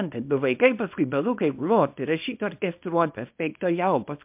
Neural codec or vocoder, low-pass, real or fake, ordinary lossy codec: codec, 24 kHz, 0.9 kbps, WavTokenizer, small release; 3.6 kHz; fake; MP3, 32 kbps